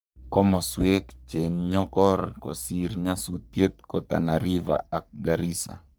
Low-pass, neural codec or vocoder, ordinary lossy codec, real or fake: none; codec, 44.1 kHz, 3.4 kbps, Pupu-Codec; none; fake